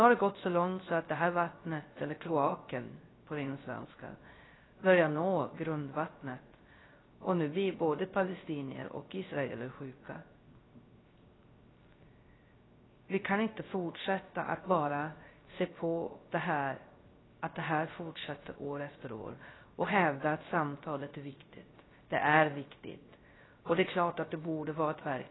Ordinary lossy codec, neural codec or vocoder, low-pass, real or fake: AAC, 16 kbps; codec, 16 kHz, 0.3 kbps, FocalCodec; 7.2 kHz; fake